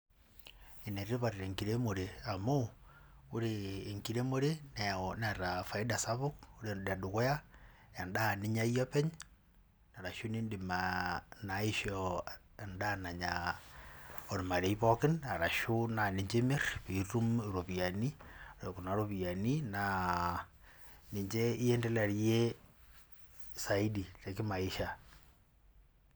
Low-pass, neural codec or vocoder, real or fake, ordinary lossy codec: none; none; real; none